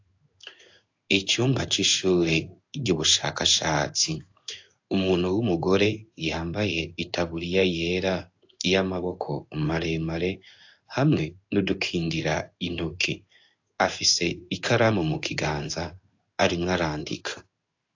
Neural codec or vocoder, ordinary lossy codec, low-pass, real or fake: codec, 16 kHz in and 24 kHz out, 1 kbps, XY-Tokenizer; AAC, 48 kbps; 7.2 kHz; fake